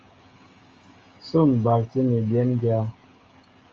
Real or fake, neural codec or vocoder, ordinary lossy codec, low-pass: fake; codec, 16 kHz, 16 kbps, FreqCodec, smaller model; Opus, 32 kbps; 7.2 kHz